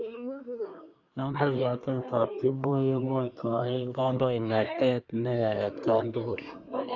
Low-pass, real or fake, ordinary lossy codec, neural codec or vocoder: 7.2 kHz; fake; none; codec, 24 kHz, 1 kbps, SNAC